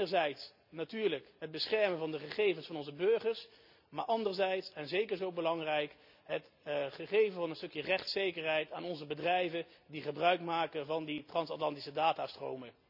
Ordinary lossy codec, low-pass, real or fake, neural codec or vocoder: none; 5.4 kHz; real; none